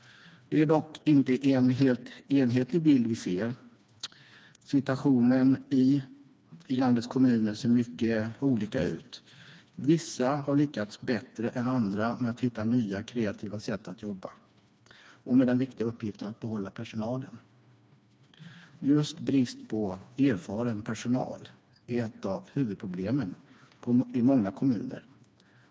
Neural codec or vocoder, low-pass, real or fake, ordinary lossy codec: codec, 16 kHz, 2 kbps, FreqCodec, smaller model; none; fake; none